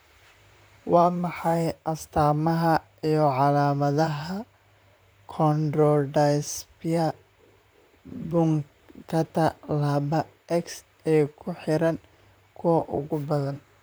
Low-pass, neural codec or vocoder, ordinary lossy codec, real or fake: none; vocoder, 44.1 kHz, 128 mel bands, Pupu-Vocoder; none; fake